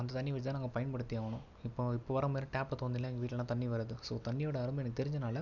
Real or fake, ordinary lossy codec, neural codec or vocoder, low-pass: real; none; none; 7.2 kHz